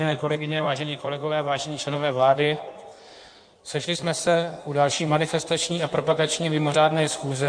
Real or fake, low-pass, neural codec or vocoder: fake; 9.9 kHz; codec, 16 kHz in and 24 kHz out, 1.1 kbps, FireRedTTS-2 codec